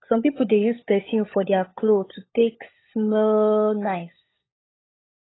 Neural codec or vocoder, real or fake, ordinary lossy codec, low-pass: codec, 16 kHz, 16 kbps, FunCodec, trained on LibriTTS, 50 frames a second; fake; AAC, 16 kbps; 7.2 kHz